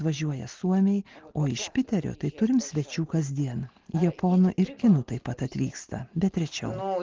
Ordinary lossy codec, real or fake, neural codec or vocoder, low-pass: Opus, 16 kbps; real; none; 7.2 kHz